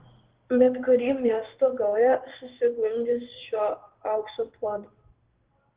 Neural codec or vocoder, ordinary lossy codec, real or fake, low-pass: codec, 16 kHz in and 24 kHz out, 1 kbps, XY-Tokenizer; Opus, 16 kbps; fake; 3.6 kHz